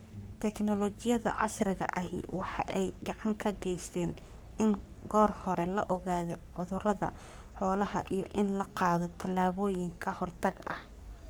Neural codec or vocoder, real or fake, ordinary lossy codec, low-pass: codec, 44.1 kHz, 3.4 kbps, Pupu-Codec; fake; none; none